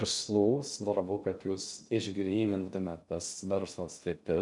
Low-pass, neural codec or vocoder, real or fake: 10.8 kHz; codec, 16 kHz in and 24 kHz out, 0.6 kbps, FocalCodec, streaming, 2048 codes; fake